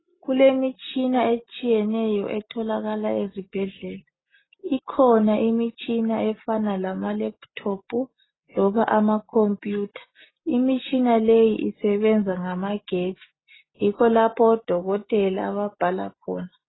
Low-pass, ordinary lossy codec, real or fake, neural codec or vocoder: 7.2 kHz; AAC, 16 kbps; real; none